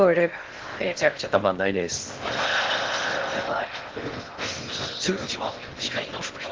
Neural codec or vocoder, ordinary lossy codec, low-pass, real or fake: codec, 16 kHz in and 24 kHz out, 0.6 kbps, FocalCodec, streaming, 2048 codes; Opus, 16 kbps; 7.2 kHz; fake